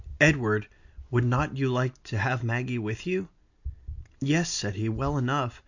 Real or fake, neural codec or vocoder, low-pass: real; none; 7.2 kHz